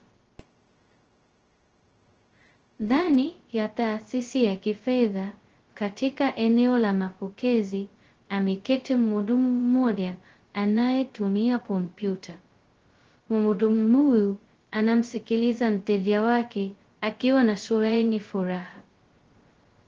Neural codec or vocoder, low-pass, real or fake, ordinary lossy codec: codec, 16 kHz, 0.2 kbps, FocalCodec; 7.2 kHz; fake; Opus, 16 kbps